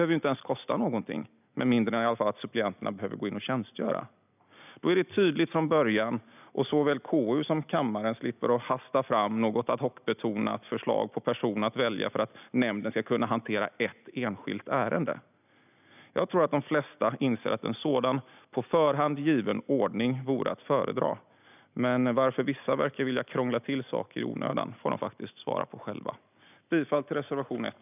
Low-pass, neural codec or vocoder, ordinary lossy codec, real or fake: 3.6 kHz; none; none; real